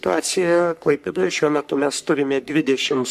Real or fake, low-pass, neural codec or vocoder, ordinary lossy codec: fake; 14.4 kHz; codec, 44.1 kHz, 3.4 kbps, Pupu-Codec; MP3, 96 kbps